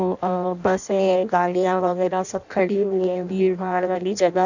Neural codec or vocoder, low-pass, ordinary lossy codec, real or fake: codec, 16 kHz in and 24 kHz out, 0.6 kbps, FireRedTTS-2 codec; 7.2 kHz; none; fake